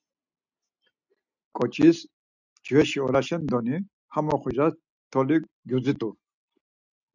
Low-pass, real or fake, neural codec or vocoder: 7.2 kHz; real; none